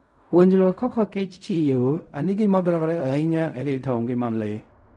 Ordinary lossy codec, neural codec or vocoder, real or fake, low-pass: none; codec, 16 kHz in and 24 kHz out, 0.4 kbps, LongCat-Audio-Codec, fine tuned four codebook decoder; fake; 10.8 kHz